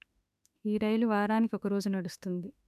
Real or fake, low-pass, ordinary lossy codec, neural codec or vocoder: fake; 14.4 kHz; none; autoencoder, 48 kHz, 32 numbers a frame, DAC-VAE, trained on Japanese speech